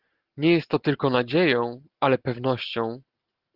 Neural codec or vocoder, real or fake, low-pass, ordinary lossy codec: none; real; 5.4 kHz; Opus, 16 kbps